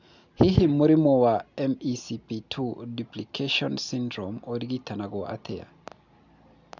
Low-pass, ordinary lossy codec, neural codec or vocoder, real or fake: 7.2 kHz; none; none; real